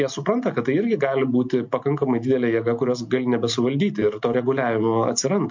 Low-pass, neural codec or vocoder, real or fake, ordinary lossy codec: 7.2 kHz; none; real; MP3, 48 kbps